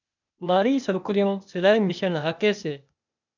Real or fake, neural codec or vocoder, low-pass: fake; codec, 16 kHz, 0.8 kbps, ZipCodec; 7.2 kHz